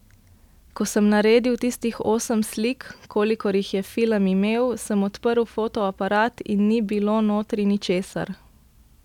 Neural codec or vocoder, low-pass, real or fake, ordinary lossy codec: none; 19.8 kHz; real; none